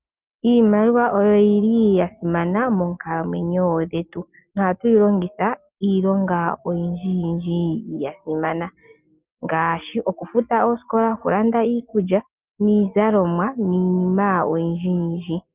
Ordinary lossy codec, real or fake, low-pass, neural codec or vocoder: Opus, 24 kbps; real; 3.6 kHz; none